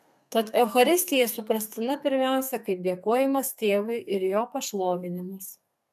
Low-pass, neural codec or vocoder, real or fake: 14.4 kHz; codec, 32 kHz, 1.9 kbps, SNAC; fake